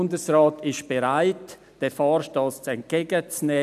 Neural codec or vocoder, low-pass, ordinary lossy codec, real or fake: none; 14.4 kHz; none; real